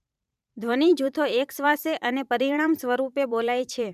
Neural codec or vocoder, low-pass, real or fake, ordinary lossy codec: vocoder, 44.1 kHz, 128 mel bands every 512 samples, BigVGAN v2; 14.4 kHz; fake; none